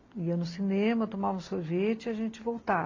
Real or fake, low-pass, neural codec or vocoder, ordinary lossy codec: real; 7.2 kHz; none; AAC, 32 kbps